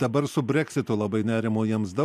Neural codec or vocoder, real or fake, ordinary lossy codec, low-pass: none; real; MP3, 96 kbps; 14.4 kHz